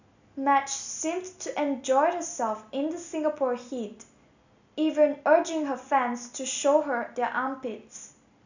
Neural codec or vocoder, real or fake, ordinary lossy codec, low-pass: none; real; none; 7.2 kHz